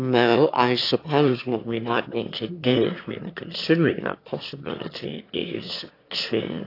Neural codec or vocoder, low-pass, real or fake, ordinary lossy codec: autoencoder, 22.05 kHz, a latent of 192 numbers a frame, VITS, trained on one speaker; 5.4 kHz; fake; AAC, 32 kbps